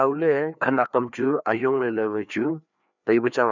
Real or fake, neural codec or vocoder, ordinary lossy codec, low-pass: fake; codec, 16 kHz, 2 kbps, FreqCodec, larger model; none; 7.2 kHz